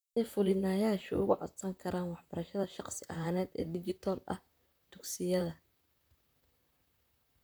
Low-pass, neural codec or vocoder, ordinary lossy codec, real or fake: none; vocoder, 44.1 kHz, 128 mel bands, Pupu-Vocoder; none; fake